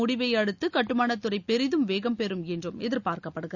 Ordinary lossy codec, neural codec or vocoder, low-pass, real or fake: none; none; 7.2 kHz; real